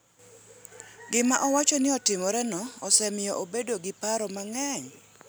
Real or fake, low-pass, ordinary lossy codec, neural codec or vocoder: real; none; none; none